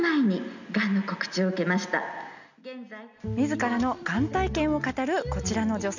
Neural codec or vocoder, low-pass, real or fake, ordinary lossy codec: none; 7.2 kHz; real; none